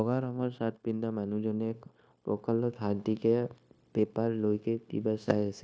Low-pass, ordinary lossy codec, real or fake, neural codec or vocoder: none; none; fake; codec, 16 kHz, 0.9 kbps, LongCat-Audio-Codec